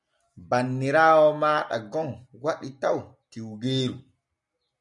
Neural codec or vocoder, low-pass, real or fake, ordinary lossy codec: none; 10.8 kHz; real; MP3, 96 kbps